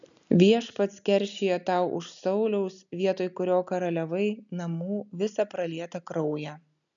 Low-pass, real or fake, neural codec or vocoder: 7.2 kHz; real; none